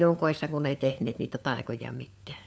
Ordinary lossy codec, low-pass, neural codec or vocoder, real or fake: none; none; codec, 16 kHz, 4 kbps, FunCodec, trained on LibriTTS, 50 frames a second; fake